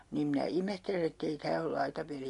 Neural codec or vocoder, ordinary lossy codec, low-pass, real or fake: none; MP3, 64 kbps; 10.8 kHz; real